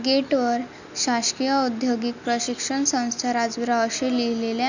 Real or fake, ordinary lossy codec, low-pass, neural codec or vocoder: real; none; 7.2 kHz; none